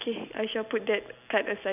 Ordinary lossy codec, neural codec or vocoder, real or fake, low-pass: none; none; real; 3.6 kHz